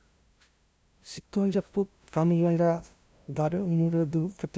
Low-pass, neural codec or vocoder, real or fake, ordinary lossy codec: none; codec, 16 kHz, 0.5 kbps, FunCodec, trained on LibriTTS, 25 frames a second; fake; none